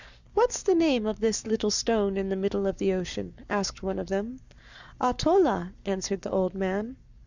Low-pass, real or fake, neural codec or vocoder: 7.2 kHz; fake; codec, 44.1 kHz, 7.8 kbps, Pupu-Codec